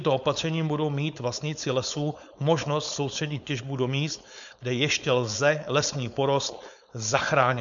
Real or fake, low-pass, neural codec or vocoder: fake; 7.2 kHz; codec, 16 kHz, 4.8 kbps, FACodec